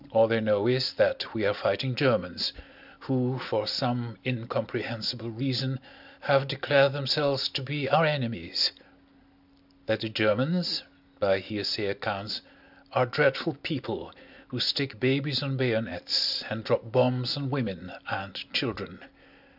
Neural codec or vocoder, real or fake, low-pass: none; real; 5.4 kHz